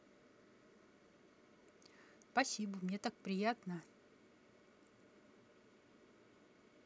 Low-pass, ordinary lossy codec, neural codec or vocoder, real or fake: none; none; none; real